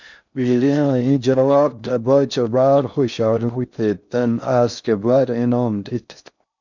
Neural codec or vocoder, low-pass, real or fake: codec, 16 kHz in and 24 kHz out, 0.6 kbps, FocalCodec, streaming, 4096 codes; 7.2 kHz; fake